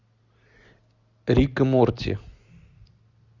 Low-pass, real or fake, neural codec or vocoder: 7.2 kHz; real; none